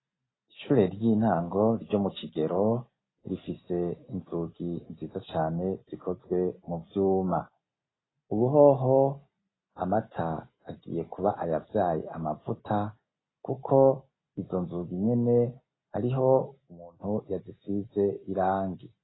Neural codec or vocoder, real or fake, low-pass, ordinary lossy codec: none; real; 7.2 kHz; AAC, 16 kbps